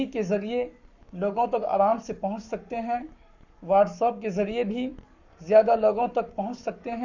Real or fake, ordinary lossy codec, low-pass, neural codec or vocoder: fake; none; 7.2 kHz; codec, 44.1 kHz, 7.8 kbps, Pupu-Codec